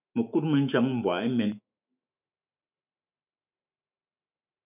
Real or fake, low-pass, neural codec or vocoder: fake; 3.6 kHz; autoencoder, 48 kHz, 128 numbers a frame, DAC-VAE, trained on Japanese speech